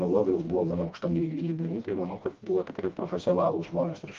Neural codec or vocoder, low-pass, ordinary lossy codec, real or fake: codec, 16 kHz, 1 kbps, FreqCodec, smaller model; 7.2 kHz; Opus, 32 kbps; fake